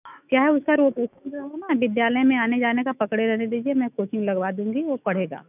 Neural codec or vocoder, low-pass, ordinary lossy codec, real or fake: autoencoder, 48 kHz, 128 numbers a frame, DAC-VAE, trained on Japanese speech; 3.6 kHz; none; fake